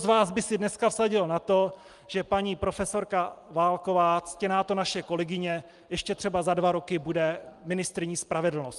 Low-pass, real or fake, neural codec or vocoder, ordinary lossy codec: 10.8 kHz; real; none; Opus, 32 kbps